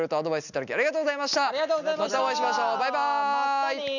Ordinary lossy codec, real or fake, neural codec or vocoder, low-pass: none; real; none; 7.2 kHz